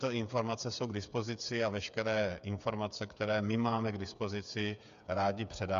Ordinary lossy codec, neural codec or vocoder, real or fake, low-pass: MP3, 64 kbps; codec, 16 kHz, 8 kbps, FreqCodec, smaller model; fake; 7.2 kHz